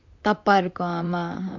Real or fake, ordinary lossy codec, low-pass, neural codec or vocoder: fake; MP3, 64 kbps; 7.2 kHz; vocoder, 44.1 kHz, 128 mel bands, Pupu-Vocoder